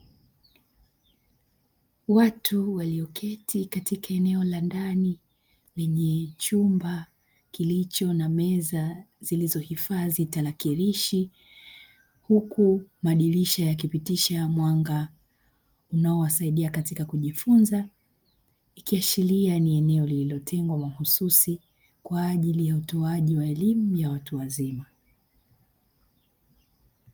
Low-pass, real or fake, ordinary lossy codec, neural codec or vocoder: 19.8 kHz; real; Opus, 32 kbps; none